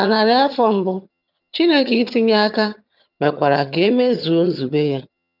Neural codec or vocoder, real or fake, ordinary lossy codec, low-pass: vocoder, 22.05 kHz, 80 mel bands, HiFi-GAN; fake; none; 5.4 kHz